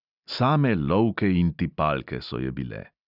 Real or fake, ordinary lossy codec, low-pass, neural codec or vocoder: real; none; 5.4 kHz; none